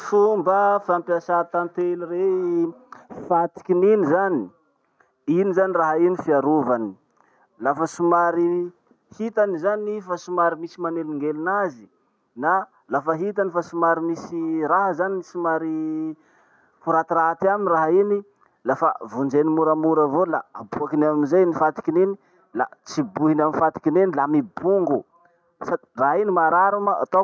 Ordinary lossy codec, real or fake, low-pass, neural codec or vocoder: none; real; none; none